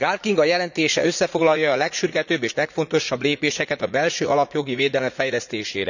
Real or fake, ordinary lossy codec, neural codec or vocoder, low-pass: fake; none; vocoder, 44.1 kHz, 80 mel bands, Vocos; 7.2 kHz